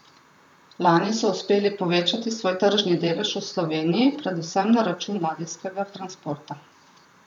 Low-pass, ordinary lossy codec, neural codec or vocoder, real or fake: 19.8 kHz; none; vocoder, 44.1 kHz, 128 mel bands, Pupu-Vocoder; fake